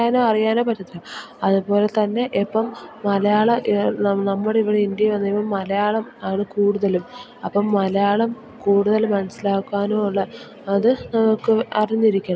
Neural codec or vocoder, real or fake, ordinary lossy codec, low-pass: none; real; none; none